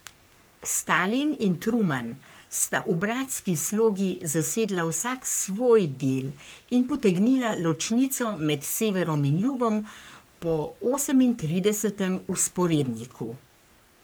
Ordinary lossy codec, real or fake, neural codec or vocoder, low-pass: none; fake; codec, 44.1 kHz, 3.4 kbps, Pupu-Codec; none